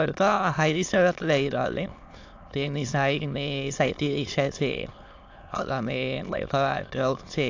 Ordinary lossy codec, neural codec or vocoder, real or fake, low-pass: AAC, 48 kbps; autoencoder, 22.05 kHz, a latent of 192 numbers a frame, VITS, trained on many speakers; fake; 7.2 kHz